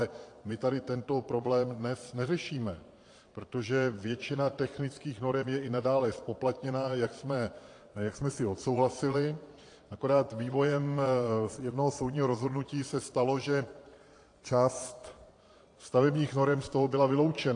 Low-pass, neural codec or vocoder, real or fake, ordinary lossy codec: 9.9 kHz; vocoder, 22.05 kHz, 80 mel bands, Vocos; fake; AAC, 48 kbps